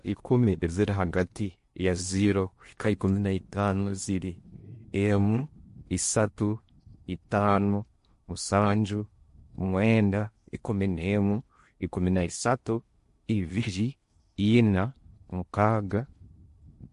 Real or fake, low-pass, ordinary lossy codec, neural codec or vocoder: fake; 10.8 kHz; MP3, 48 kbps; codec, 16 kHz in and 24 kHz out, 0.8 kbps, FocalCodec, streaming, 65536 codes